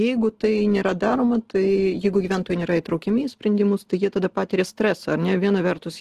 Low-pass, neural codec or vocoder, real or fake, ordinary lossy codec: 14.4 kHz; none; real; Opus, 16 kbps